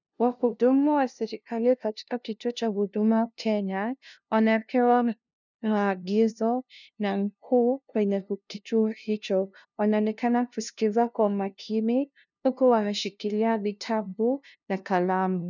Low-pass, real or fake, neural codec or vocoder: 7.2 kHz; fake; codec, 16 kHz, 0.5 kbps, FunCodec, trained on LibriTTS, 25 frames a second